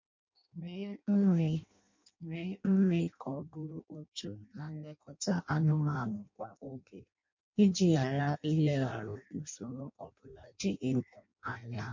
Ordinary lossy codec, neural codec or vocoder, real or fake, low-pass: MP3, 48 kbps; codec, 16 kHz in and 24 kHz out, 0.6 kbps, FireRedTTS-2 codec; fake; 7.2 kHz